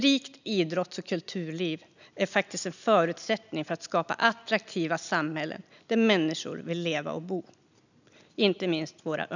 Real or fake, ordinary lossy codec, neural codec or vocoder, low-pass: real; none; none; 7.2 kHz